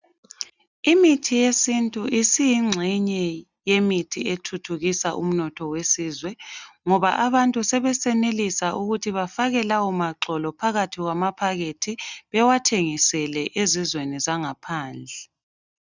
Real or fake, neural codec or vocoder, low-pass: real; none; 7.2 kHz